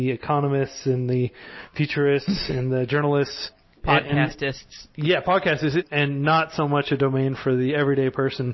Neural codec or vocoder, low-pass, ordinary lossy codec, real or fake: none; 7.2 kHz; MP3, 24 kbps; real